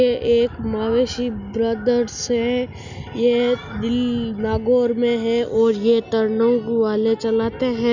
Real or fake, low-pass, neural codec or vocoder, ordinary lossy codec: real; 7.2 kHz; none; none